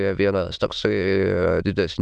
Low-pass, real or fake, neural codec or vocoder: 9.9 kHz; fake; autoencoder, 22.05 kHz, a latent of 192 numbers a frame, VITS, trained on many speakers